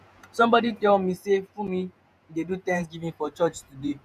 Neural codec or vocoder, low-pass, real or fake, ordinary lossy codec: vocoder, 48 kHz, 128 mel bands, Vocos; 14.4 kHz; fake; none